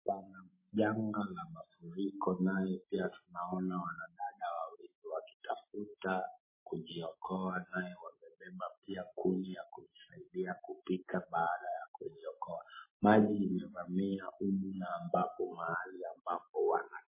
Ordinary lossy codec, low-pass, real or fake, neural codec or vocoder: MP3, 16 kbps; 3.6 kHz; real; none